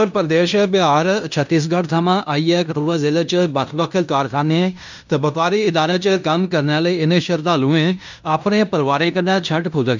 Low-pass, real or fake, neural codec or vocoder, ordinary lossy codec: 7.2 kHz; fake; codec, 16 kHz in and 24 kHz out, 0.9 kbps, LongCat-Audio-Codec, fine tuned four codebook decoder; none